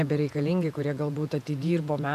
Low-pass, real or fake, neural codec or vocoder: 14.4 kHz; fake; vocoder, 48 kHz, 128 mel bands, Vocos